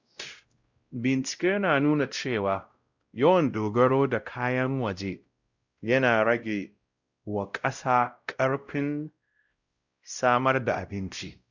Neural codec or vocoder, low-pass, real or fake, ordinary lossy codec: codec, 16 kHz, 0.5 kbps, X-Codec, WavLM features, trained on Multilingual LibriSpeech; 7.2 kHz; fake; Opus, 64 kbps